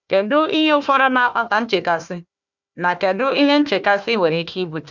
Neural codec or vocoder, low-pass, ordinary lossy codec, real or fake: codec, 16 kHz, 1 kbps, FunCodec, trained on Chinese and English, 50 frames a second; 7.2 kHz; none; fake